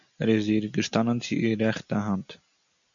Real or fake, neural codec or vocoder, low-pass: real; none; 7.2 kHz